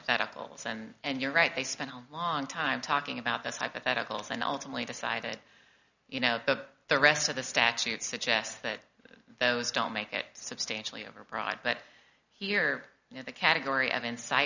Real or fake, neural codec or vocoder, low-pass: real; none; 7.2 kHz